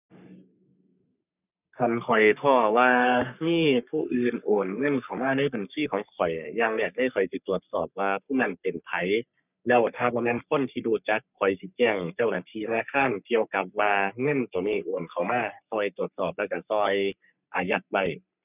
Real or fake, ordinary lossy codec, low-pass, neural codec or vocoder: fake; none; 3.6 kHz; codec, 44.1 kHz, 3.4 kbps, Pupu-Codec